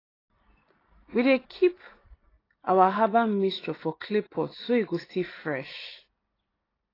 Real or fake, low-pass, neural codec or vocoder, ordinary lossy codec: real; 5.4 kHz; none; AAC, 24 kbps